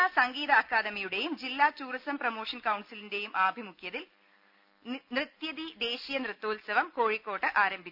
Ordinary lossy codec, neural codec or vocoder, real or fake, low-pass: none; none; real; 5.4 kHz